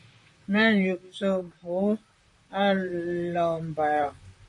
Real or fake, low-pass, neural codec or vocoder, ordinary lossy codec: fake; 10.8 kHz; vocoder, 44.1 kHz, 128 mel bands, Pupu-Vocoder; MP3, 48 kbps